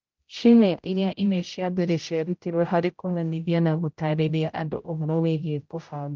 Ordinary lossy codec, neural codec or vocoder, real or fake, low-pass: Opus, 24 kbps; codec, 16 kHz, 0.5 kbps, X-Codec, HuBERT features, trained on general audio; fake; 7.2 kHz